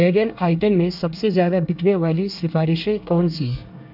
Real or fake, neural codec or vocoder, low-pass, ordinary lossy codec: fake; codec, 24 kHz, 1 kbps, SNAC; 5.4 kHz; none